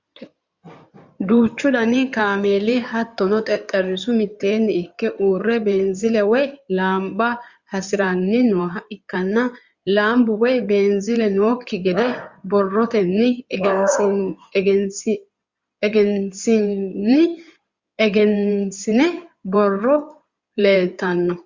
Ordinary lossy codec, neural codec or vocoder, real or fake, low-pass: Opus, 64 kbps; codec, 16 kHz in and 24 kHz out, 2.2 kbps, FireRedTTS-2 codec; fake; 7.2 kHz